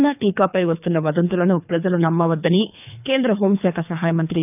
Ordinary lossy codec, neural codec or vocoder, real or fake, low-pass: none; codec, 24 kHz, 3 kbps, HILCodec; fake; 3.6 kHz